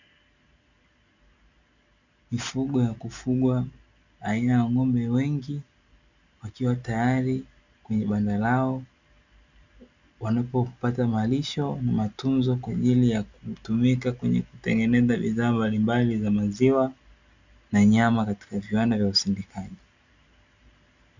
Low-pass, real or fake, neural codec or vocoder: 7.2 kHz; real; none